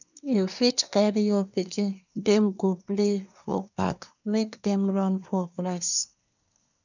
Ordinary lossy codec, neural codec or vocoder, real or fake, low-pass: none; codec, 24 kHz, 1 kbps, SNAC; fake; 7.2 kHz